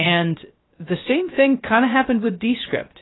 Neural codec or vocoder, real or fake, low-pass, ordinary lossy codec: codec, 16 kHz, 0.3 kbps, FocalCodec; fake; 7.2 kHz; AAC, 16 kbps